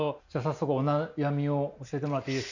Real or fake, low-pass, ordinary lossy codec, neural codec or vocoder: real; 7.2 kHz; none; none